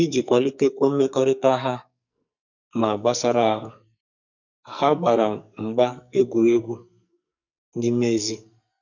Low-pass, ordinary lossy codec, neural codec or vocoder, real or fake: 7.2 kHz; none; codec, 44.1 kHz, 2.6 kbps, SNAC; fake